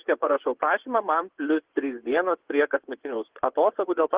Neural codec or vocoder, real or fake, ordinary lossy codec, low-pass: vocoder, 24 kHz, 100 mel bands, Vocos; fake; Opus, 16 kbps; 3.6 kHz